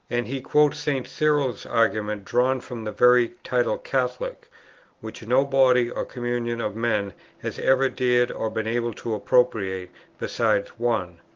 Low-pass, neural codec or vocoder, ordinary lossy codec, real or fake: 7.2 kHz; none; Opus, 16 kbps; real